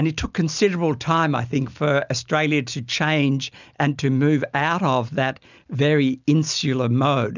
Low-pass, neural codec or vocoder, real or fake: 7.2 kHz; none; real